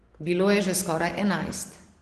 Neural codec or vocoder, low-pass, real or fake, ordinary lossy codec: none; 10.8 kHz; real; Opus, 16 kbps